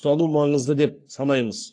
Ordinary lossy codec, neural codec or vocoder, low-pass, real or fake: AAC, 48 kbps; codec, 44.1 kHz, 3.4 kbps, Pupu-Codec; 9.9 kHz; fake